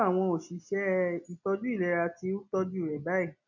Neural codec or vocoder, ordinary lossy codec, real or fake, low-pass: none; none; real; 7.2 kHz